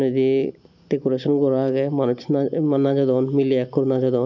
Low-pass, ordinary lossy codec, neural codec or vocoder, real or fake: 7.2 kHz; none; none; real